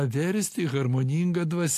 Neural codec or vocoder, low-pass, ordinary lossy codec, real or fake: none; 14.4 kHz; AAC, 64 kbps; real